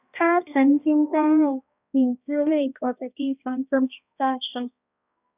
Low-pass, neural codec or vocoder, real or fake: 3.6 kHz; codec, 16 kHz, 1 kbps, X-Codec, HuBERT features, trained on balanced general audio; fake